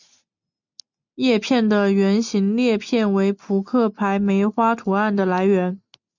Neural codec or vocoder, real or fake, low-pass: none; real; 7.2 kHz